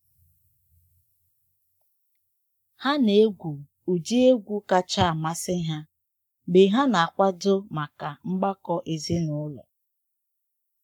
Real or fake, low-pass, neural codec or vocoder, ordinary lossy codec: fake; 19.8 kHz; codec, 44.1 kHz, 7.8 kbps, Pupu-Codec; none